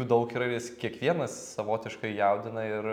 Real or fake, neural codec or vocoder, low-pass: real; none; 19.8 kHz